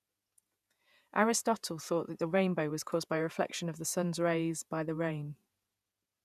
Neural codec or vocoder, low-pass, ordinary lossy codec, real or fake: vocoder, 48 kHz, 128 mel bands, Vocos; 14.4 kHz; none; fake